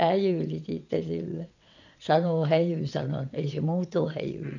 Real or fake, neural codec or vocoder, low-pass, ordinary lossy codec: fake; vocoder, 22.05 kHz, 80 mel bands, WaveNeXt; 7.2 kHz; none